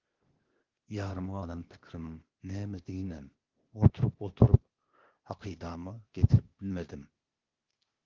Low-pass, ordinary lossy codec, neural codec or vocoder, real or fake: 7.2 kHz; Opus, 16 kbps; codec, 16 kHz, 0.8 kbps, ZipCodec; fake